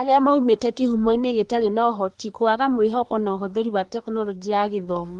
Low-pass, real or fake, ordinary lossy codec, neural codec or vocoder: 10.8 kHz; fake; Opus, 24 kbps; codec, 24 kHz, 1 kbps, SNAC